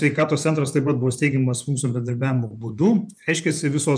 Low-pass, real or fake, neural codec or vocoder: 9.9 kHz; fake; vocoder, 24 kHz, 100 mel bands, Vocos